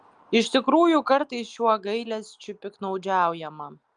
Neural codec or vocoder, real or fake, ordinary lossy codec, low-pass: none; real; Opus, 32 kbps; 9.9 kHz